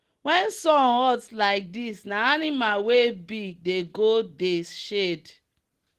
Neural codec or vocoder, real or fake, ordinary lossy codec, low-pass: none; real; Opus, 16 kbps; 14.4 kHz